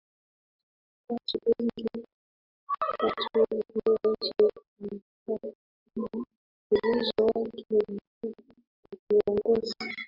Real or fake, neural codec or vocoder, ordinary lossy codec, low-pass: real; none; Opus, 64 kbps; 5.4 kHz